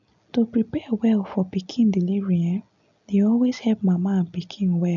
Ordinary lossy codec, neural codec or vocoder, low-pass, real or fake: none; none; 7.2 kHz; real